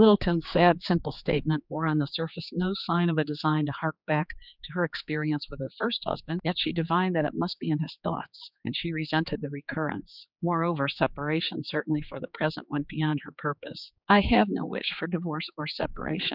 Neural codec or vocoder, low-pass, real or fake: codec, 16 kHz, 4 kbps, X-Codec, HuBERT features, trained on general audio; 5.4 kHz; fake